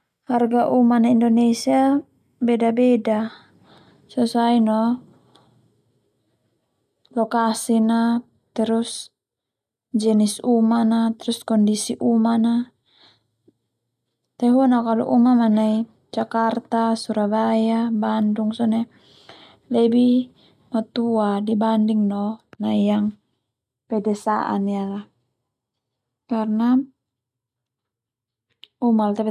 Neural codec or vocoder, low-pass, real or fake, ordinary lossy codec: none; 14.4 kHz; real; none